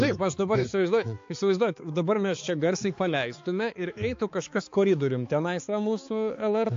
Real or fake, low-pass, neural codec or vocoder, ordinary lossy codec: fake; 7.2 kHz; codec, 16 kHz, 4 kbps, X-Codec, HuBERT features, trained on balanced general audio; AAC, 48 kbps